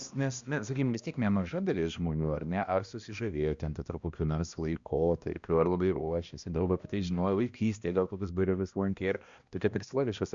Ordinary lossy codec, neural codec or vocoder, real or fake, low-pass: Opus, 64 kbps; codec, 16 kHz, 1 kbps, X-Codec, HuBERT features, trained on balanced general audio; fake; 7.2 kHz